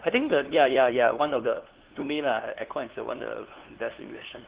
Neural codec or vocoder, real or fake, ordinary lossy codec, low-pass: codec, 16 kHz, 2 kbps, FunCodec, trained on LibriTTS, 25 frames a second; fake; Opus, 16 kbps; 3.6 kHz